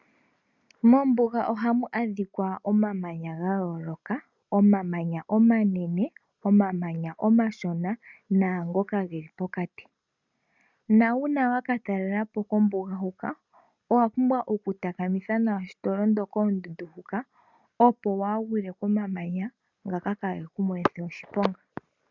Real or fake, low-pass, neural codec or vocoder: real; 7.2 kHz; none